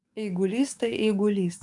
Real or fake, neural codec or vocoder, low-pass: fake; codec, 44.1 kHz, 7.8 kbps, DAC; 10.8 kHz